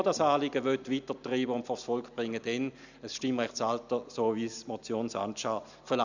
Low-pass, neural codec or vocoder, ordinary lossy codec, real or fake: 7.2 kHz; none; none; real